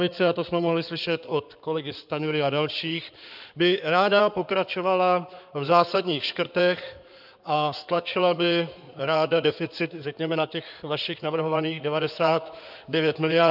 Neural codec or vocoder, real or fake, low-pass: codec, 16 kHz in and 24 kHz out, 2.2 kbps, FireRedTTS-2 codec; fake; 5.4 kHz